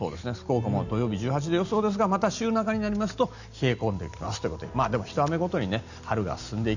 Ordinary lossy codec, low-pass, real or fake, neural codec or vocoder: none; 7.2 kHz; real; none